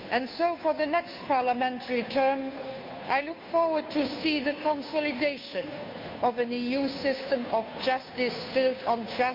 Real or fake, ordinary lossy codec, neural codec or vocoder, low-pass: fake; MP3, 48 kbps; codec, 16 kHz, 2 kbps, FunCodec, trained on Chinese and English, 25 frames a second; 5.4 kHz